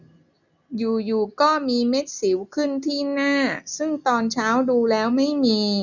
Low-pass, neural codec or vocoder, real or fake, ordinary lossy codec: 7.2 kHz; none; real; none